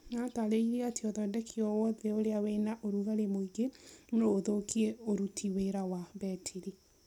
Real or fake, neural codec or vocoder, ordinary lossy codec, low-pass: real; none; none; 19.8 kHz